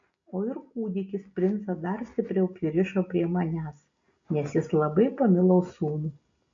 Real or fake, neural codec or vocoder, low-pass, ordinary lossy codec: real; none; 7.2 kHz; AAC, 48 kbps